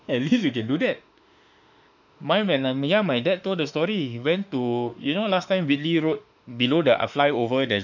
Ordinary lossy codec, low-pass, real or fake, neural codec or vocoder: none; 7.2 kHz; fake; autoencoder, 48 kHz, 32 numbers a frame, DAC-VAE, trained on Japanese speech